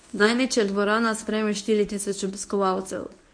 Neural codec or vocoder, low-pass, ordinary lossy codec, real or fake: codec, 24 kHz, 0.9 kbps, WavTokenizer, medium speech release version 1; 9.9 kHz; MP3, 48 kbps; fake